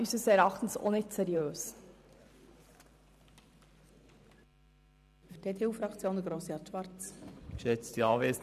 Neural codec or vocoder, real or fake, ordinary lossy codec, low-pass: vocoder, 44.1 kHz, 128 mel bands every 512 samples, BigVGAN v2; fake; none; 14.4 kHz